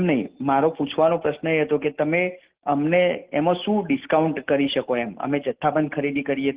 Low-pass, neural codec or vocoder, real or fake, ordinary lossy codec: 3.6 kHz; none; real; Opus, 16 kbps